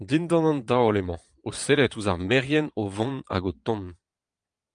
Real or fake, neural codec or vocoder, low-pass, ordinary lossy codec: fake; vocoder, 22.05 kHz, 80 mel bands, Vocos; 9.9 kHz; Opus, 32 kbps